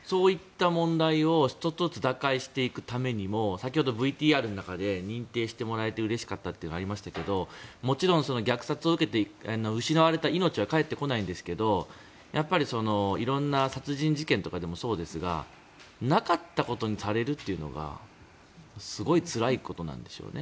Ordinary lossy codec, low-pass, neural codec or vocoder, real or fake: none; none; none; real